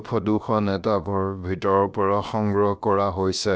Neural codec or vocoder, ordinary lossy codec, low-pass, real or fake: codec, 16 kHz, about 1 kbps, DyCAST, with the encoder's durations; none; none; fake